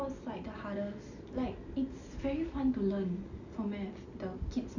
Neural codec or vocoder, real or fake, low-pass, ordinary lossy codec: none; real; 7.2 kHz; none